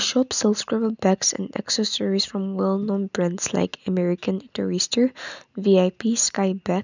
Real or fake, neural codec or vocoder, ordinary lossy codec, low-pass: real; none; none; 7.2 kHz